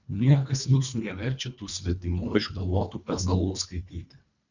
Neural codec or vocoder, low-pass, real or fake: codec, 24 kHz, 1.5 kbps, HILCodec; 7.2 kHz; fake